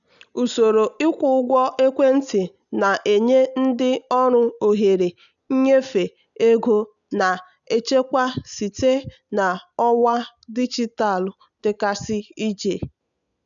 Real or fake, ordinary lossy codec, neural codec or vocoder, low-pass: real; none; none; 7.2 kHz